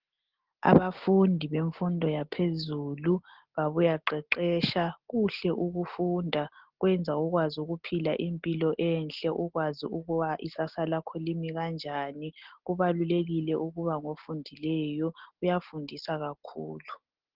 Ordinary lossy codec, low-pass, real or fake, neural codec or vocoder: Opus, 16 kbps; 5.4 kHz; real; none